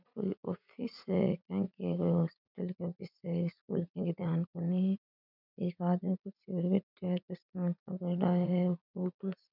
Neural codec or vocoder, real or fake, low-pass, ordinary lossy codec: vocoder, 44.1 kHz, 80 mel bands, Vocos; fake; 5.4 kHz; none